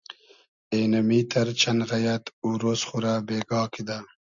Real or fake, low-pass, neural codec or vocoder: real; 7.2 kHz; none